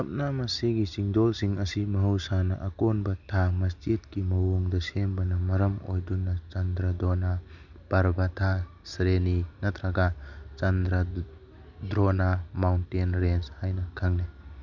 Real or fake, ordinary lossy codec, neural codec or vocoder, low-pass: real; none; none; 7.2 kHz